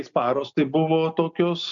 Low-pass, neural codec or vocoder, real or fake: 7.2 kHz; none; real